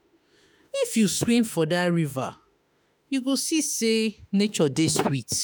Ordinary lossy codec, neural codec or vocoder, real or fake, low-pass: none; autoencoder, 48 kHz, 32 numbers a frame, DAC-VAE, trained on Japanese speech; fake; none